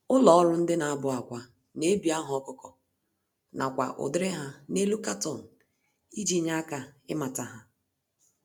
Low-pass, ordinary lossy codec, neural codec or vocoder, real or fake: none; none; none; real